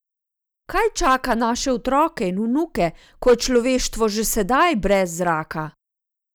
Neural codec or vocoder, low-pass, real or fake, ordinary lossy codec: none; none; real; none